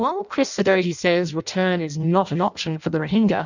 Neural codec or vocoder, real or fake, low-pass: codec, 16 kHz in and 24 kHz out, 0.6 kbps, FireRedTTS-2 codec; fake; 7.2 kHz